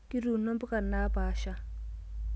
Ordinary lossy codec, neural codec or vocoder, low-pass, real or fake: none; none; none; real